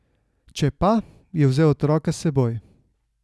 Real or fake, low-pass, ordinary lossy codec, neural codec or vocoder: real; none; none; none